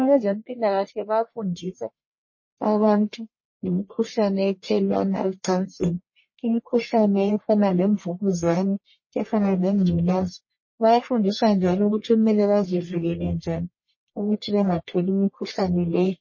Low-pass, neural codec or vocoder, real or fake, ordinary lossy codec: 7.2 kHz; codec, 44.1 kHz, 1.7 kbps, Pupu-Codec; fake; MP3, 32 kbps